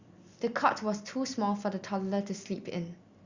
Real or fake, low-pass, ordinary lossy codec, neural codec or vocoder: real; 7.2 kHz; Opus, 64 kbps; none